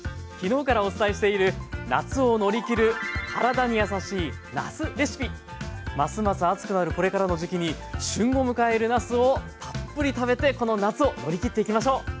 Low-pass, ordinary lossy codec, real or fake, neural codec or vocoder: none; none; real; none